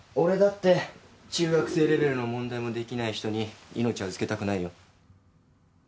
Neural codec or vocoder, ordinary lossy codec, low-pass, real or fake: none; none; none; real